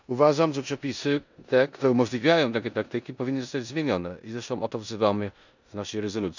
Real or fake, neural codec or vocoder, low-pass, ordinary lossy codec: fake; codec, 16 kHz in and 24 kHz out, 0.9 kbps, LongCat-Audio-Codec, four codebook decoder; 7.2 kHz; none